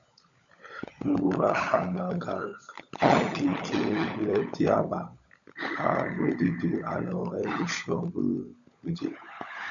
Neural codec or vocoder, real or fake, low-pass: codec, 16 kHz, 16 kbps, FunCodec, trained on LibriTTS, 50 frames a second; fake; 7.2 kHz